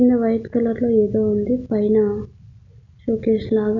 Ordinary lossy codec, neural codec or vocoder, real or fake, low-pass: none; none; real; 7.2 kHz